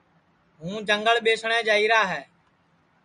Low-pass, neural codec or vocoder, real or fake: 9.9 kHz; none; real